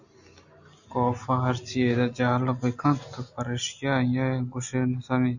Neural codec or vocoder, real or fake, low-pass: none; real; 7.2 kHz